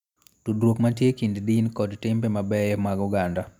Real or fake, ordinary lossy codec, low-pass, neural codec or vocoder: real; none; 19.8 kHz; none